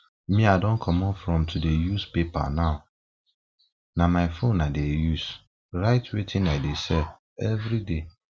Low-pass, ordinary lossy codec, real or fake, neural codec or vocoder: none; none; real; none